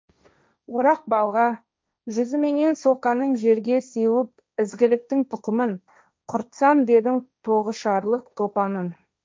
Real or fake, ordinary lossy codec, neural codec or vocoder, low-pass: fake; none; codec, 16 kHz, 1.1 kbps, Voila-Tokenizer; none